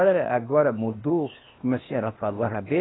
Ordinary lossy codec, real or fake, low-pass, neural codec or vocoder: AAC, 16 kbps; fake; 7.2 kHz; codec, 16 kHz, 0.8 kbps, ZipCodec